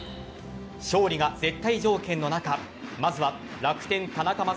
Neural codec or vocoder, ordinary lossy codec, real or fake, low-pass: none; none; real; none